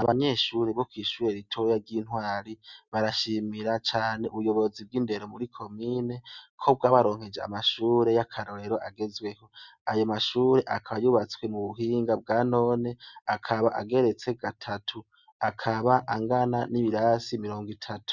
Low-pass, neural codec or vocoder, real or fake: 7.2 kHz; none; real